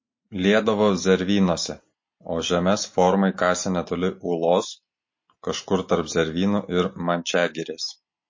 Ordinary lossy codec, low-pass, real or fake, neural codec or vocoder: MP3, 32 kbps; 7.2 kHz; real; none